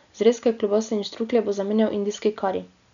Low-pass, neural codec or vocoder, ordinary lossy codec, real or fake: 7.2 kHz; none; none; real